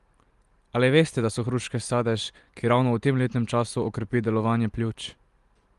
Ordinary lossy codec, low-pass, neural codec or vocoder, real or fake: Opus, 24 kbps; 10.8 kHz; none; real